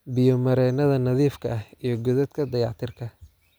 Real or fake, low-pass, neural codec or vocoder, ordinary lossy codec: real; none; none; none